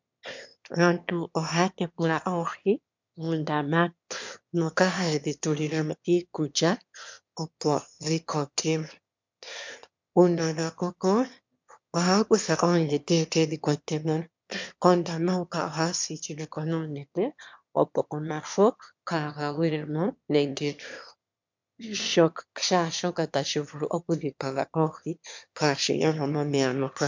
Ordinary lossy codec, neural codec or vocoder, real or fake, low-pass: MP3, 64 kbps; autoencoder, 22.05 kHz, a latent of 192 numbers a frame, VITS, trained on one speaker; fake; 7.2 kHz